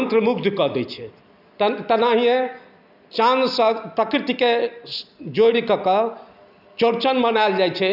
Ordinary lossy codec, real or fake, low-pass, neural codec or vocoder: none; real; 5.4 kHz; none